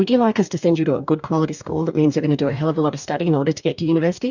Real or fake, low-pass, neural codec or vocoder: fake; 7.2 kHz; codec, 44.1 kHz, 2.6 kbps, DAC